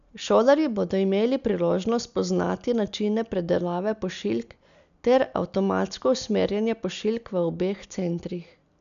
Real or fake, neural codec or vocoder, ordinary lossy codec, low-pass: real; none; none; 7.2 kHz